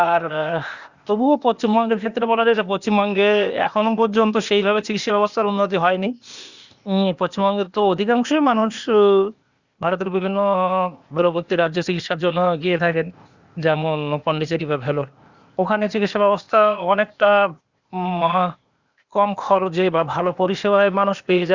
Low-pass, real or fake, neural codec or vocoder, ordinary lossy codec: 7.2 kHz; fake; codec, 16 kHz, 0.8 kbps, ZipCodec; Opus, 64 kbps